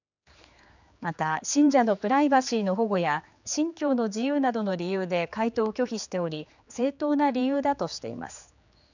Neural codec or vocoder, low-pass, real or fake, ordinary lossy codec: codec, 16 kHz, 4 kbps, X-Codec, HuBERT features, trained on general audio; 7.2 kHz; fake; none